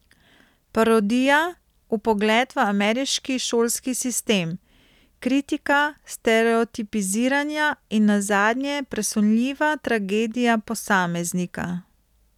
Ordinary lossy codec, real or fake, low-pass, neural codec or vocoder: none; real; 19.8 kHz; none